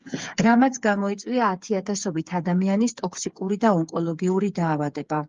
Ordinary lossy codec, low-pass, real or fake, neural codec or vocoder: Opus, 32 kbps; 7.2 kHz; fake; codec, 16 kHz, 8 kbps, FreqCodec, smaller model